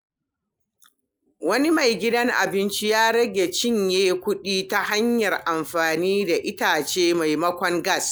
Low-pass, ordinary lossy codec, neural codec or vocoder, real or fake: none; none; none; real